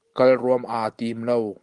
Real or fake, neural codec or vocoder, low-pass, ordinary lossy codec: real; none; 10.8 kHz; Opus, 24 kbps